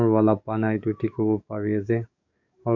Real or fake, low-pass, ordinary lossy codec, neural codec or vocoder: fake; 7.2 kHz; none; codec, 44.1 kHz, 7.8 kbps, DAC